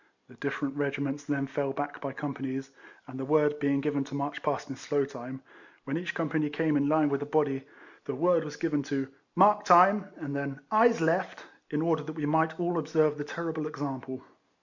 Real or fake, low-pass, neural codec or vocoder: real; 7.2 kHz; none